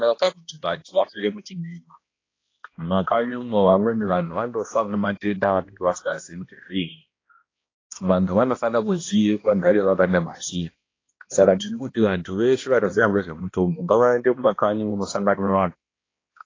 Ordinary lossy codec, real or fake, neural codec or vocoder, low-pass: AAC, 32 kbps; fake; codec, 16 kHz, 1 kbps, X-Codec, HuBERT features, trained on balanced general audio; 7.2 kHz